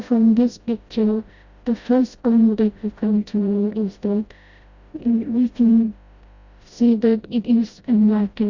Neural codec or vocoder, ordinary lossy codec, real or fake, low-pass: codec, 16 kHz, 0.5 kbps, FreqCodec, smaller model; Opus, 64 kbps; fake; 7.2 kHz